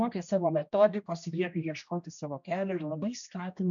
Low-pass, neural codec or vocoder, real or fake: 7.2 kHz; codec, 16 kHz, 1 kbps, X-Codec, HuBERT features, trained on general audio; fake